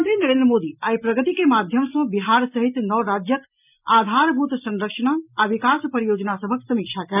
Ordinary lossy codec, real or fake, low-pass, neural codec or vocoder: none; real; 3.6 kHz; none